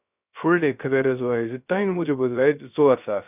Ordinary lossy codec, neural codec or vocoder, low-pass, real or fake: none; codec, 16 kHz, 0.3 kbps, FocalCodec; 3.6 kHz; fake